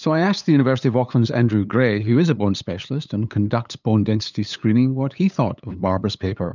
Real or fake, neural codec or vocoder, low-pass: fake; codec, 16 kHz, 8 kbps, FunCodec, trained on LibriTTS, 25 frames a second; 7.2 kHz